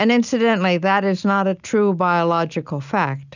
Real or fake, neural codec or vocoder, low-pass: real; none; 7.2 kHz